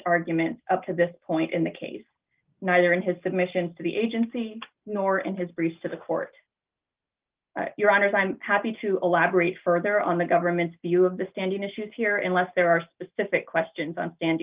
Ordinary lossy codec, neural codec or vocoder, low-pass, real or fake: Opus, 32 kbps; none; 3.6 kHz; real